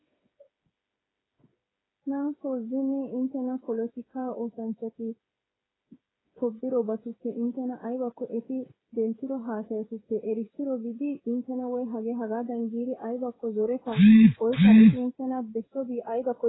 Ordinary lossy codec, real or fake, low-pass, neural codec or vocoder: AAC, 16 kbps; fake; 7.2 kHz; codec, 16 kHz, 8 kbps, FreqCodec, smaller model